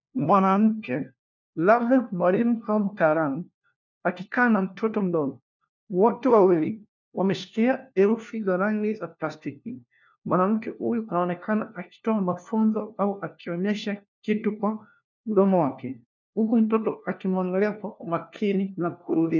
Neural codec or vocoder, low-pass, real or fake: codec, 16 kHz, 1 kbps, FunCodec, trained on LibriTTS, 50 frames a second; 7.2 kHz; fake